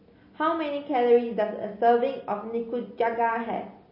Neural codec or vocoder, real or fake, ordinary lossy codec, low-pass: none; real; MP3, 24 kbps; 5.4 kHz